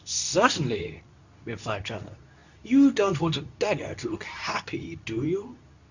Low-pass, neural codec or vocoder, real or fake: 7.2 kHz; codec, 24 kHz, 0.9 kbps, WavTokenizer, medium speech release version 2; fake